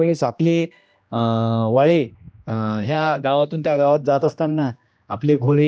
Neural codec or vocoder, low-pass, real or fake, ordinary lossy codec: codec, 16 kHz, 1 kbps, X-Codec, HuBERT features, trained on general audio; none; fake; none